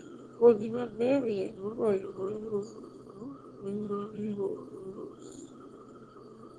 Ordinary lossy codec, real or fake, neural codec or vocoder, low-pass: Opus, 16 kbps; fake; autoencoder, 22.05 kHz, a latent of 192 numbers a frame, VITS, trained on one speaker; 9.9 kHz